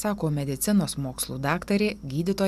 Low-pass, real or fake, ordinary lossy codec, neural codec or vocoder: 14.4 kHz; real; MP3, 96 kbps; none